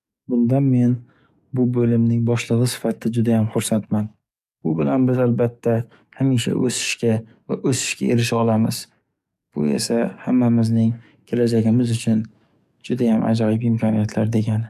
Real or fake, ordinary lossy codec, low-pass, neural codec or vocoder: fake; none; 14.4 kHz; codec, 44.1 kHz, 7.8 kbps, DAC